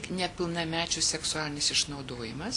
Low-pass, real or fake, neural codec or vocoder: 10.8 kHz; real; none